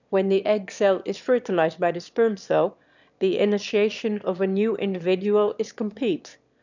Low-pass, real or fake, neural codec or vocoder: 7.2 kHz; fake; autoencoder, 22.05 kHz, a latent of 192 numbers a frame, VITS, trained on one speaker